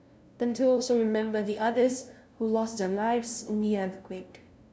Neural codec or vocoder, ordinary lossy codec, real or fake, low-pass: codec, 16 kHz, 0.5 kbps, FunCodec, trained on LibriTTS, 25 frames a second; none; fake; none